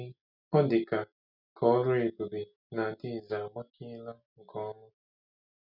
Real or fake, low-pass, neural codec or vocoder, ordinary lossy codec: real; 5.4 kHz; none; none